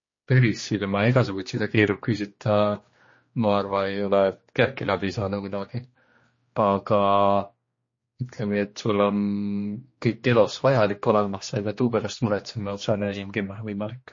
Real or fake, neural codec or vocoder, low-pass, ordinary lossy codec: fake; codec, 16 kHz, 2 kbps, X-Codec, HuBERT features, trained on general audio; 7.2 kHz; MP3, 32 kbps